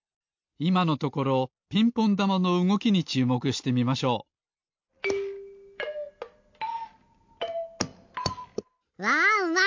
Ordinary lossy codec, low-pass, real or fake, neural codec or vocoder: MP3, 48 kbps; 7.2 kHz; real; none